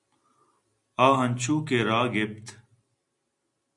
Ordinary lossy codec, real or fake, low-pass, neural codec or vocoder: AAC, 64 kbps; real; 10.8 kHz; none